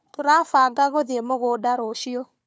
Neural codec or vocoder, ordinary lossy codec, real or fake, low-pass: codec, 16 kHz, 4 kbps, FunCodec, trained on Chinese and English, 50 frames a second; none; fake; none